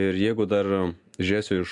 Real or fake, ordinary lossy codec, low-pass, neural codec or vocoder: real; AAC, 64 kbps; 10.8 kHz; none